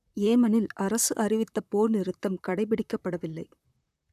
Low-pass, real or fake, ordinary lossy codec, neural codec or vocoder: 14.4 kHz; fake; none; vocoder, 44.1 kHz, 128 mel bands, Pupu-Vocoder